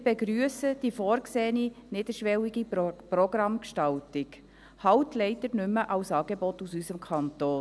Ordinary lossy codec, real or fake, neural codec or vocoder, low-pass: none; real; none; none